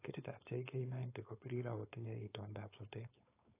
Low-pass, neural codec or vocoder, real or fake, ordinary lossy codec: 3.6 kHz; codec, 16 kHz, 4.8 kbps, FACodec; fake; AAC, 24 kbps